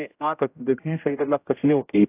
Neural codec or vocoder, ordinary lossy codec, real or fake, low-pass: codec, 16 kHz, 0.5 kbps, X-Codec, HuBERT features, trained on general audio; AAC, 24 kbps; fake; 3.6 kHz